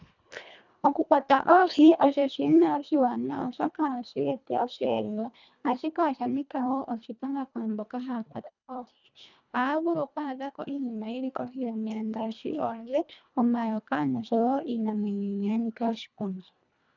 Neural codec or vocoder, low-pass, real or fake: codec, 24 kHz, 1.5 kbps, HILCodec; 7.2 kHz; fake